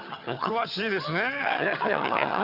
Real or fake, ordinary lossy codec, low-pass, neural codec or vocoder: fake; Opus, 64 kbps; 5.4 kHz; vocoder, 22.05 kHz, 80 mel bands, HiFi-GAN